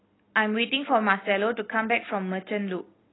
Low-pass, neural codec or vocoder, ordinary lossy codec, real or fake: 7.2 kHz; none; AAC, 16 kbps; real